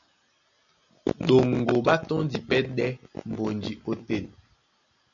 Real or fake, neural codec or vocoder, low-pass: real; none; 7.2 kHz